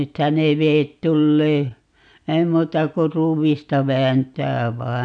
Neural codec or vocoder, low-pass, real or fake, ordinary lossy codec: none; 9.9 kHz; real; none